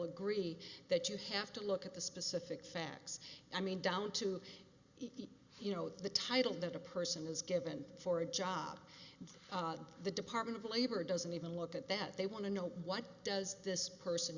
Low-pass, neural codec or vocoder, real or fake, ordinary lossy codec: 7.2 kHz; none; real; Opus, 64 kbps